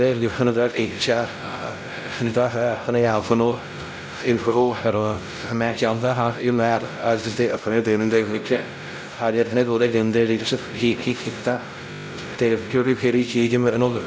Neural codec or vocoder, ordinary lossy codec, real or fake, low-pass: codec, 16 kHz, 0.5 kbps, X-Codec, WavLM features, trained on Multilingual LibriSpeech; none; fake; none